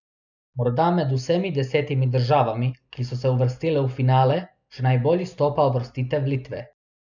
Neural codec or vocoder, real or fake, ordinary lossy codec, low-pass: none; real; none; 7.2 kHz